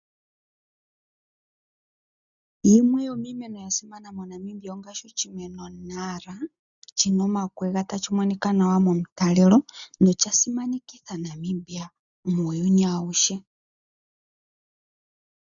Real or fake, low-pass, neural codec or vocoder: real; 7.2 kHz; none